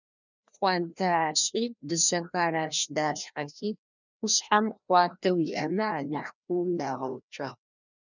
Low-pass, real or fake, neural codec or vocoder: 7.2 kHz; fake; codec, 16 kHz, 1 kbps, FreqCodec, larger model